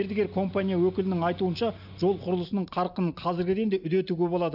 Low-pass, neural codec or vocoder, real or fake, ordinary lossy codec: 5.4 kHz; none; real; none